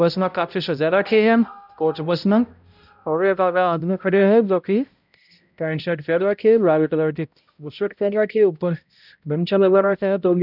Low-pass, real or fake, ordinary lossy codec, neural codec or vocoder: 5.4 kHz; fake; none; codec, 16 kHz, 0.5 kbps, X-Codec, HuBERT features, trained on balanced general audio